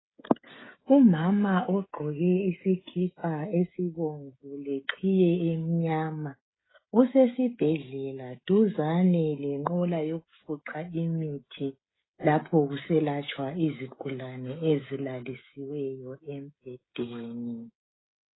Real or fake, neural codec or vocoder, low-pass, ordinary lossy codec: fake; codec, 16 kHz, 16 kbps, FreqCodec, smaller model; 7.2 kHz; AAC, 16 kbps